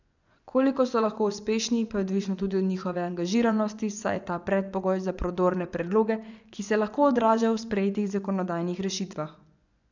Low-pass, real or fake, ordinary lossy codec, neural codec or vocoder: 7.2 kHz; fake; none; vocoder, 24 kHz, 100 mel bands, Vocos